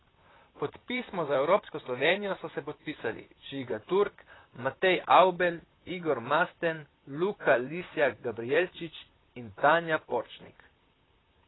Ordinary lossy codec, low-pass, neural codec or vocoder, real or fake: AAC, 16 kbps; 7.2 kHz; vocoder, 44.1 kHz, 128 mel bands, Pupu-Vocoder; fake